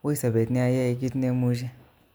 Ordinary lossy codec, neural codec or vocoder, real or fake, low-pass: none; none; real; none